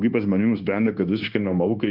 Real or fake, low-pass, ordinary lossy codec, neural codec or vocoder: fake; 5.4 kHz; Opus, 32 kbps; codec, 16 kHz, 0.9 kbps, LongCat-Audio-Codec